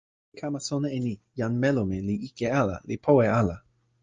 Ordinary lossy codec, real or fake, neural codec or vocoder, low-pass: Opus, 24 kbps; real; none; 7.2 kHz